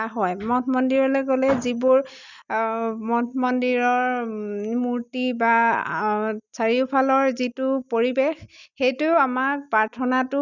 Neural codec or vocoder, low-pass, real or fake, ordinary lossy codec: none; 7.2 kHz; real; none